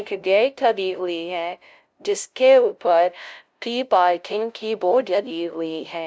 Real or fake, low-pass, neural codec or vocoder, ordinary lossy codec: fake; none; codec, 16 kHz, 0.5 kbps, FunCodec, trained on LibriTTS, 25 frames a second; none